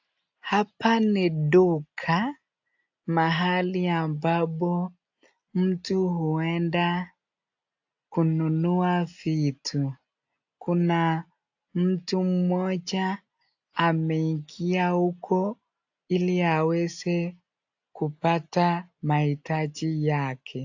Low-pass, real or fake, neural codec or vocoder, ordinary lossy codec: 7.2 kHz; real; none; AAC, 48 kbps